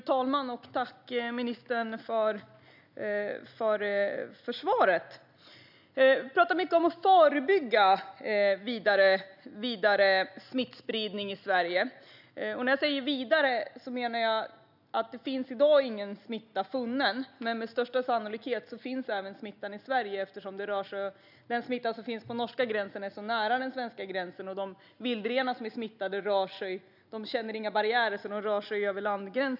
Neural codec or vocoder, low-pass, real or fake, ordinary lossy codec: none; 5.4 kHz; real; none